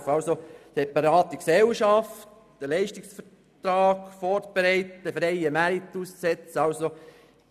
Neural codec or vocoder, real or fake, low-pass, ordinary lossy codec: none; real; 14.4 kHz; none